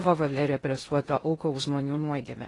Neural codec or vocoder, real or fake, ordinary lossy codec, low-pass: codec, 16 kHz in and 24 kHz out, 0.6 kbps, FocalCodec, streaming, 2048 codes; fake; AAC, 32 kbps; 10.8 kHz